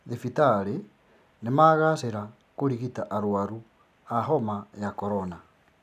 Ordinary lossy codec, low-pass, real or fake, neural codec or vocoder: none; 14.4 kHz; real; none